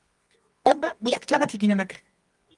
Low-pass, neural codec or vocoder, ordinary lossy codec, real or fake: 10.8 kHz; codec, 24 kHz, 0.9 kbps, WavTokenizer, medium music audio release; Opus, 32 kbps; fake